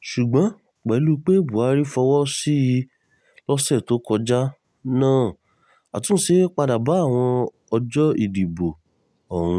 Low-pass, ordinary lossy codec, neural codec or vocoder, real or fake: none; none; none; real